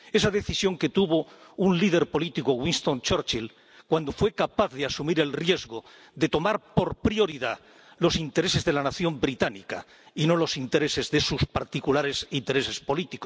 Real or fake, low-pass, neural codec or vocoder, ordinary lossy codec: real; none; none; none